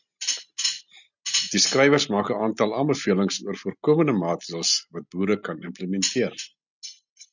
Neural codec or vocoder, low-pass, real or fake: none; 7.2 kHz; real